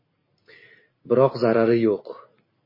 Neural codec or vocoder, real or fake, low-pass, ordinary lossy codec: none; real; 5.4 kHz; MP3, 24 kbps